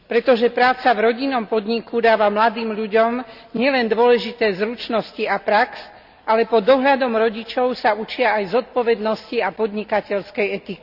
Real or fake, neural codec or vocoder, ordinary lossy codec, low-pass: real; none; Opus, 64 kbps; 5.4 kHz